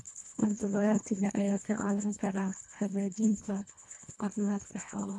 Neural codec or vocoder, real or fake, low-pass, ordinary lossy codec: codec, 24 kHz, 1.5 kbps, HILCodec; fake; none; none